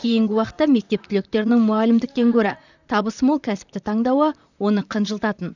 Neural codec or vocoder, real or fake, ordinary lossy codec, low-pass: vocoder, 44.1 kHz, 128 mel bands, Pupu-Vocoder; fake; none; 7.2 kHz